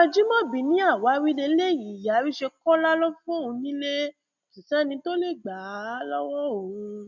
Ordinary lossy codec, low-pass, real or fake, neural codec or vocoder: none; none; real; none